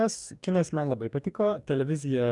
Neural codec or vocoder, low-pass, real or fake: codec, 44.1 kHz, 2.6 kbps, DAC; 10.8 kHz; fake